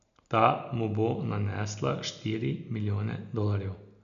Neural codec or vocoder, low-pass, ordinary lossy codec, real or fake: none; 7.2 kHz; MP3, 96 kbps; real